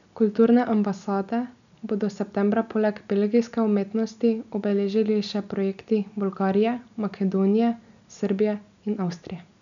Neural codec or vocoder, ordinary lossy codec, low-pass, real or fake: none; none; 7.2 kHz; real